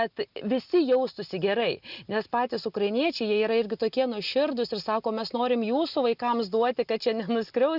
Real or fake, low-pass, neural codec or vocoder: real; 5.4 kHz; none